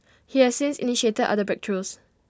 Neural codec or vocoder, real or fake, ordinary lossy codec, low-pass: none; real; none; none